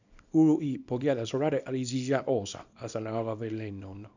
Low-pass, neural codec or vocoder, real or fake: 7.2 kHz; codec, 24 kHz, 0.9 kbps, WavTokenizer, small release; fake